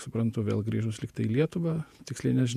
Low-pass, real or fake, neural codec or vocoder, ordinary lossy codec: 14.4 kHz; fake; vocoder, 48 kHz, 128 mel bands, Vocos; MP3, 96 kbps